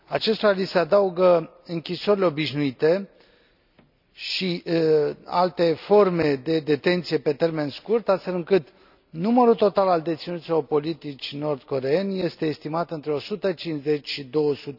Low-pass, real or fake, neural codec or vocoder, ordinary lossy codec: 5.4 kHz; real; none; none